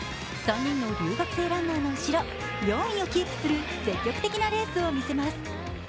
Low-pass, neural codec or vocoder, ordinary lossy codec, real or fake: none; none; none; real